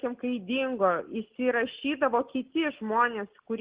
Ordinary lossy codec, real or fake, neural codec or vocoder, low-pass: Opus, 16 kbps; real; none; 3.6 kHz